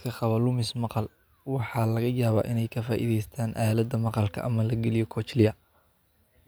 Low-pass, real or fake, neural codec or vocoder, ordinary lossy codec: none; real; none; none